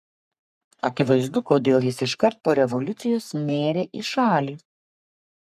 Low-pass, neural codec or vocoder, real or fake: 14.4 kHz; codec, 44.1 kHz, 3.4 kbps, Pupu-Codec; fake